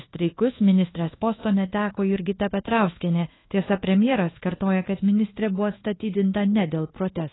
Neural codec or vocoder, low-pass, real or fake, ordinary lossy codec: codec, 24 kHz, 1.2 kbps, DualCodec; 7.2 kHz; fake; AAC, 16 kbps